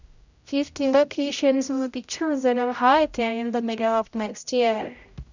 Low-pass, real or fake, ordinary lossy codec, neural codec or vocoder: 7.2 kHz; fake; none; codec, 16 kHz, 0.5 kbps, X-Codec, HuBERT features, trained on general audio